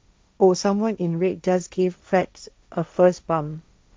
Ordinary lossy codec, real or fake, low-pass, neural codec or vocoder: none; fake; none; codec, 16 kHz, 1.1 kbps, Voila-Tokenizer